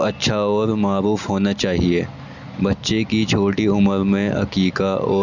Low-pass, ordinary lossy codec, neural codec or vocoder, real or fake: 7.2 kHz; none; none; real